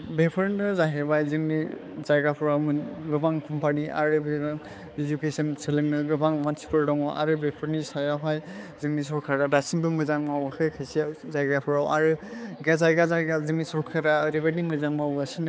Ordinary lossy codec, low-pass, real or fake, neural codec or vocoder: none; none; fake; codec, 16 kHz, 4 kbps, X-Codec, HuBERT features, trained on balanced general audio